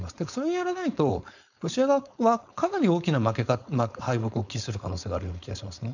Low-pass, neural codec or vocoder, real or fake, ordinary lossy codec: 7.2 kHz; codec, 16 kHz, 4.8 kbps, FACodec; fake; none